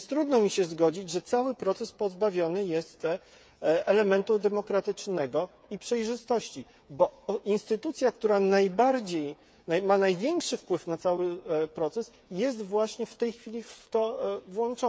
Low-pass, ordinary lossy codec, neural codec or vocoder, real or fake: none; none; codec, 16 kHz, 8 kbps, FreqCodec, smaller model; fake